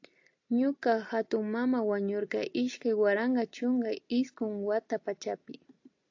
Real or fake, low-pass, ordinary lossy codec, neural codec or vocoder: real; 7.2 kHz; AAC, 48 kbps; none